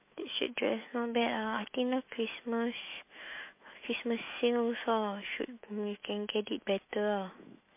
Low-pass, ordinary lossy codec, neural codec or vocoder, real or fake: 3.6 kHz; MP3, 24 kbps; autoencoder, 48 kHz, 128 numbers a frame, DAC-VAE, trained on Japanese speech; fake